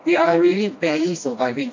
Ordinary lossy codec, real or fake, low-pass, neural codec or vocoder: AAC, 48 kbps; fake; 7.2 kHz; codec, 16 kHz, 1 kbps, FreqCodec, smaller model